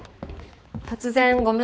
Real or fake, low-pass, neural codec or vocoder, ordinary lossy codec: fake; none; codec, 16 kHz, 4 kbps, X-Codec, HuBERT features, trained on balanced general audio; none